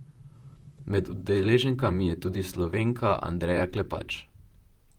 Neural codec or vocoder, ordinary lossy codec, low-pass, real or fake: vocoder, 44.1 kHz, 128 mel bands, Pupu-Vocoder; Opus, 32 kbps; 19.8 kHz; fake